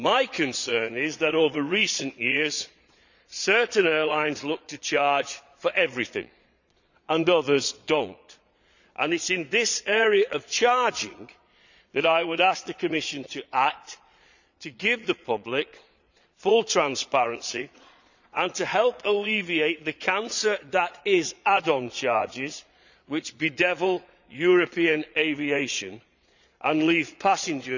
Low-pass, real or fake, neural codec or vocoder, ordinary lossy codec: 7.2 kHz; fake; vocoder, 22.05 kHz, 80 mel bands, Vocos; none